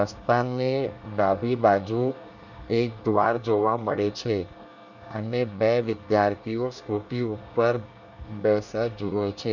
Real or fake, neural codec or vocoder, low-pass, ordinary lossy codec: fake; codec, 24 kHz, 1 kbps, SNAC; 7.2 kHz; none